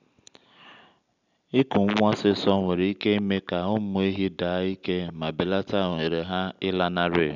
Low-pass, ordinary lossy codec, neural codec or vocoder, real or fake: 7.2 kHz; none; none; real